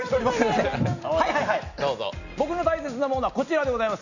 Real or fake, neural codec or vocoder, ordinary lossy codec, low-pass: real; none; none; 7.2 kHz